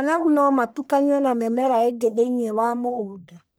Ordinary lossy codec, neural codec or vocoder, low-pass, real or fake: none; codec, 44.1 kHz, 1.7 kbps, Pupu-Codec; none; fake